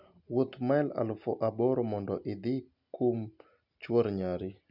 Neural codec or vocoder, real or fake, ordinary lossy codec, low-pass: none; real; none; 5.4 kHz